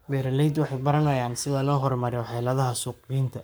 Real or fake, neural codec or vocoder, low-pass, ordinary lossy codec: fake; codec, 44.1 kHz, 7.8 kbps, Pupu-Codec; none; none